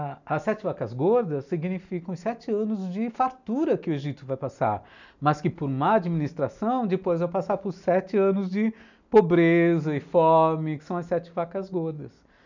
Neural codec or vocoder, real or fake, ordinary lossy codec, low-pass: none; real; none; 7.2 kHz